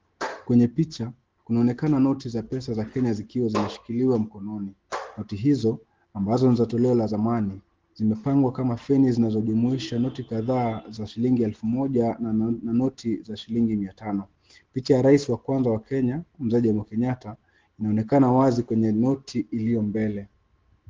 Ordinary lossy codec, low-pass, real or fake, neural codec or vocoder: Opus, 16 kbps; 7.2 kHz; real; none